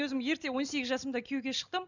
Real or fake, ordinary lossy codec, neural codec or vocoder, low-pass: real; none; none; 7.2 kHz